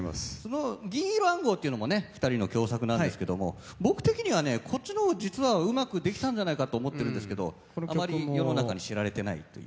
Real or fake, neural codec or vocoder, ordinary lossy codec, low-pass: real; none; none; none